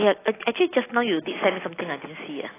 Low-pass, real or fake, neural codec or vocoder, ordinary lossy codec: 3.6 kHz; real; none; AAC, 16 kbps